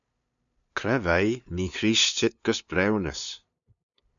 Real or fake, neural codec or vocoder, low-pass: fake; codec, 16 kHz, 2 kbps, FunCodec, trained on LibriTTS, 25 frames a second; 7.2 kHz